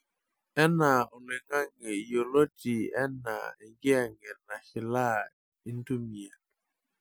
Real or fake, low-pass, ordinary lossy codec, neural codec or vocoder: real; none; none; none